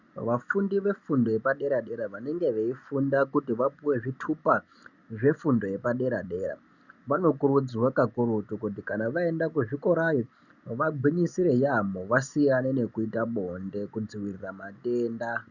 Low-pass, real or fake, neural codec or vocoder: 7.2 kHz; real; none